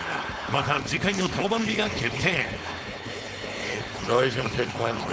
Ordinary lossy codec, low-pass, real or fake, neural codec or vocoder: none; none; fake; codec, 16 kHz, 4.8 kbps, FACodec